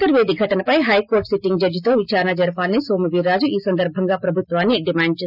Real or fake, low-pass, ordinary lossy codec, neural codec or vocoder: real; 5.4 kHz; none; none